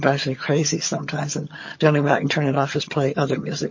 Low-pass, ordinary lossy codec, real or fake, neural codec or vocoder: 7.2 kHz; MP3, 32 kbps; fake; vocoder, 22.05 kHz, 80 mel bands, HiFi-GAN